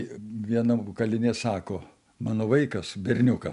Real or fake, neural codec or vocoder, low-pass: real; none; 10.8 kHz